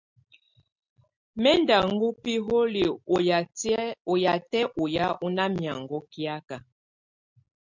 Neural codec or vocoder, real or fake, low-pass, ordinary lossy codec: none; real; 7.2 kHz; MP3, 64 kbps